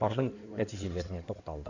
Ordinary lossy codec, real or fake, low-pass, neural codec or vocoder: none; fake; 7.2 kHz; codec, 16 kHz in and 24 kHz out, 2.2 kbps, FireRedTTS-2 codec